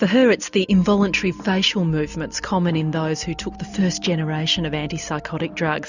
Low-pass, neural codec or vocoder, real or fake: 7.2 kHz; none; real